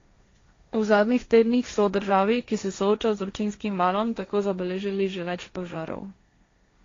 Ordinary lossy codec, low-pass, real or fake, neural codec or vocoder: AAC, 32 kbps; 7.2 kHz; fake; codec, 16 kHz, 1.1 kbps, Voila-Tokenizer